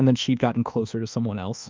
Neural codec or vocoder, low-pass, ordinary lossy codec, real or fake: codec, 24 kHz, 1.2 kbps, DualCodec; 7.2 kHz; Opus, 24 kbps; fake